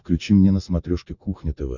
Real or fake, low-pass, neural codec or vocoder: real; 7.2 kHz; none